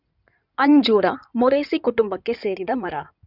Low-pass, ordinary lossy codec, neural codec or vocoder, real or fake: 5.4 kHz; none; codec, 16 kHz in and 24 kHz out, 2.2 kbps, FireRedTTS-2 codec; fake